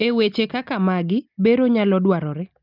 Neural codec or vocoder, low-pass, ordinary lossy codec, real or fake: none; 5.4 kHz; Opus, 24 kbps; real